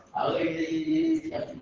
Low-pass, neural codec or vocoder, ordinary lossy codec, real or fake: 7.2 kHz; vocoder, 44.1 kHz, 128 mel bands, Pupu-Vocoder; Opus, 16 kbps; fake